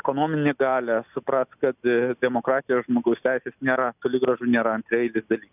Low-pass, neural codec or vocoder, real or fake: 3.6 kHz; none; real